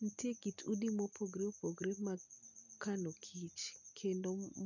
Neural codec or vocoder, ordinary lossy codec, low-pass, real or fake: none; none; 7.2 kHz; real